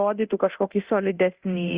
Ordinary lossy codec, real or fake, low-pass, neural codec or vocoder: Opus, 64 kbps; fake; 3.6 kHz; codec, 24 kHz, 0.9 kbps, DualCodec